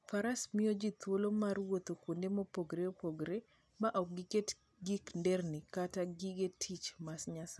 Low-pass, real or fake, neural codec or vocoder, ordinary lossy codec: none; real; none; none